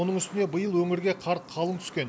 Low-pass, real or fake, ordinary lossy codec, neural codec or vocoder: none; real; none; none